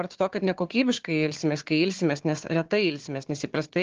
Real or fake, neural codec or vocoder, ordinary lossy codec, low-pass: fake; codec, 16 kHz, 4 kbps, FunCodec, trained on Chinese and English, 50 frames a second; Opus, 16 kbps; 7.2 kHz